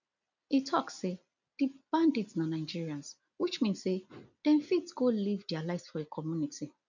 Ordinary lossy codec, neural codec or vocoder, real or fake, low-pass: none; none; real; 7.2 kHz